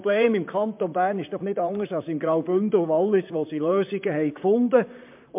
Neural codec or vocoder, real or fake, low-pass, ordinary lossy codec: vocoder, 44.1 kHz, 128 mel bands, Pupu-Vocoder; fake; 3.6 kHz; MP3, 32 kbps